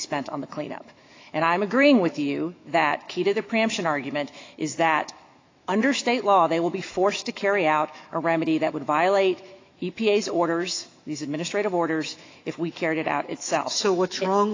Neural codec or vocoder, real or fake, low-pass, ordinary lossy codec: autoencoder, 48 kHz, 128 numbers a frame, DAC-VAE, trained on Japanese speech; fake; 7.2 kHz; AAC, 32 kbps